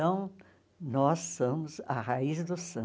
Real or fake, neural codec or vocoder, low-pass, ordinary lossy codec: real; none; none; none